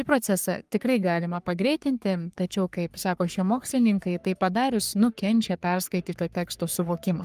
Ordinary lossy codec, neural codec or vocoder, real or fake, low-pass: Opus, 32 kbps; codec, 44.1 kHz, 3.4 kbps, Pupu-Codec; fake; 14.4 kHz